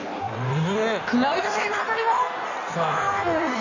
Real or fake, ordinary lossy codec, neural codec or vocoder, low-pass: fake; none; codec, 16 kHz in and 24 kHz out, 1.1 kbps, FireRedTTS-2 codec; 7.2 kHz